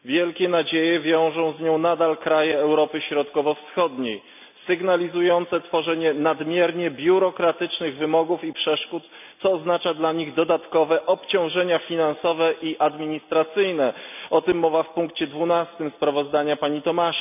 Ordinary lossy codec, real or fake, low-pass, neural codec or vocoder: none; real; 3.6 kHz; none